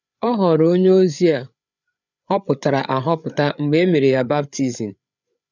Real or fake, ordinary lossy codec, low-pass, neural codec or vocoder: fake; none; 7.2 kHz; codec, 16 kHz, 8 kbps, FreqCodec, larger model